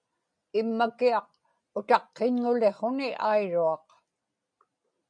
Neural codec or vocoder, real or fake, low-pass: none; real; 9.9 kHz